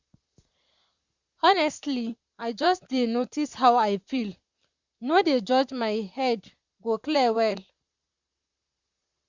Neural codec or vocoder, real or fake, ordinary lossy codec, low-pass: vocoder, 44.1 kHz, 128 mel bands, Pupu-Vocoder; fake; Opus, 64 kbps; 7.2 kHz